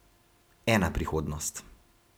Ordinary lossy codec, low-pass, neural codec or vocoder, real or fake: none; none; none; real